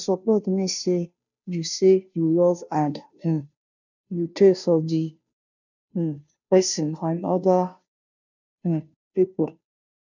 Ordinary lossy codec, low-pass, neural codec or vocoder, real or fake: none; 7.2 kHz; codec, 16 kHz, 0.5 kbps, FunCodec, trained on Chinese and English, 25 frames a second; fake